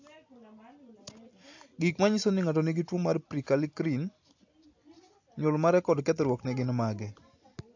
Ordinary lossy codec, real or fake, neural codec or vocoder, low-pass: AAC, 48 kbps; real; none; 7.2 kHz